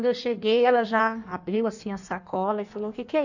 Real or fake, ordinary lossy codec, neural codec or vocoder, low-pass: fake; none; codec, 16 kHz in and 24 kHz out, 1.1 kbps, FireRedTTS-2 codec; 7.2 kHz